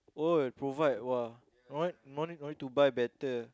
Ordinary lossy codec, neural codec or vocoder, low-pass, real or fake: none; none; none; real